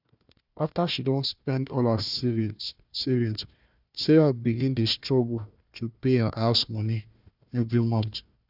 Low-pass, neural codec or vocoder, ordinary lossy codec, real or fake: 5.4 kHz; codec, 16 kHz, 1 kbps, FunCodec, trained on Chinese and English, 50 frames a second; none; fake